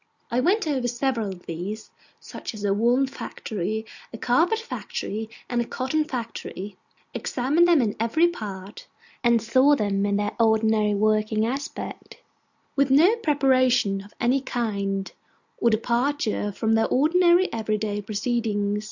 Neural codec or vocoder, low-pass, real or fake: none; 7.2 kHz; real